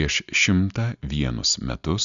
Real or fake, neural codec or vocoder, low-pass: real; none; 7.2 kHz